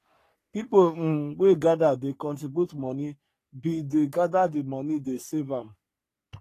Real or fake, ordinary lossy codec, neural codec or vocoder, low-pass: fake; AAC, 48 kbps; codec, 44.1 kHz, 3.4 kbps, Pupu-Codec; 14.4 kHz